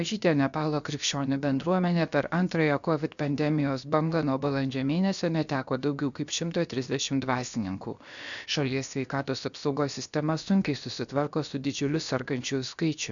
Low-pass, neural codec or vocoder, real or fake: 7.2 kHz; codec, 16 kHz, 0.7 kbps, FocalCodec; fake